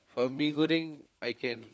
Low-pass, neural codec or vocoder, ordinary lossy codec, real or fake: none; codec, 16 kHz, 4 kbps, FunCodec, trained on LibriTTS, 50 frames a second; none; fake